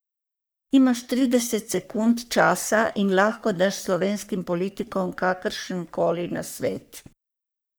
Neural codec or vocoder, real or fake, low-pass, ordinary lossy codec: codec, 44.1 kHz, 3.4 kbps, Pupu-Codec; fake; none; none